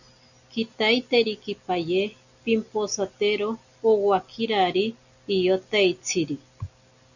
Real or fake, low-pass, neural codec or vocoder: real; 7.2 kHz; none